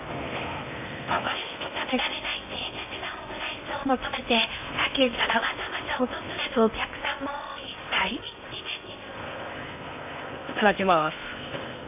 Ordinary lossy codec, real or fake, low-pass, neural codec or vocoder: MP3, 32 kbps; fake; 3.6 kHz; codec, 16 kHz in and 24 kHz out, 0.6 kbps, FocalCodec, streaming, 4096 codes